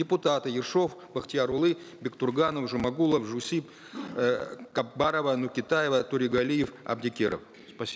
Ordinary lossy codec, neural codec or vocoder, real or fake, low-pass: none; none; real; none